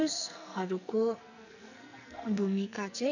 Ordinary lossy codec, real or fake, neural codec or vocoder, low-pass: none; fake; codec, 44.1 kHz, 2.6 kbps, SNAC; 7.2 kHz